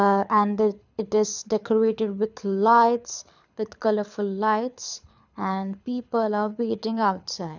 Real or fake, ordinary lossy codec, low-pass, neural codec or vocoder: fake; none; 7.2 kHz; codec, 24 kHz, 6 kbps, HILCodec